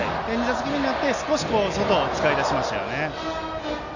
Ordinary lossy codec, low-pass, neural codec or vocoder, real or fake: none; 7.2 kHz; none; real